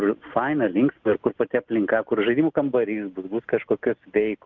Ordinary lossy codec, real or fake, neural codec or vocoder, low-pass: Opus, 32 kbps; real; none; 7.2 kHz